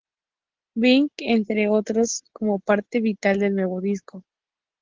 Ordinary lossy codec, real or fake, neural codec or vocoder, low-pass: Opus, 16 kbps; real; none; 7.2 kHz